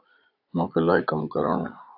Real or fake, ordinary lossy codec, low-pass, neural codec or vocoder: fake; AAC, 32 kbps; 5.4 kHz; vocoder, 22.05 kHz, 80 mel bands, Vocos